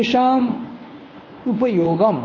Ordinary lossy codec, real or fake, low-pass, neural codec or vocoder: MP3, 32 kbps; fake; 7.2 kHz; codec, 24 kHz, 0.9 kbps, WavTokenizer, medium speech release version 1